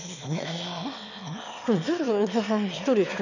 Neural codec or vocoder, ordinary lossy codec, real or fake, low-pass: autoencoder, 22.05 kHz, a latent of 192 numbers a frame, VITS, trained on one speaker; none; fake; 7.2 kHz